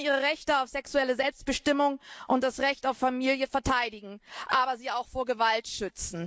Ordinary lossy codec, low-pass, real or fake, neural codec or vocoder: none; none; real; none